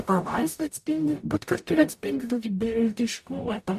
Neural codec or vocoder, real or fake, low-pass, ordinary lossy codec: codec, 44.1 kHz, 0.9 kbps, DAC; fake; 14.4 kHz; MP3, 64 kbps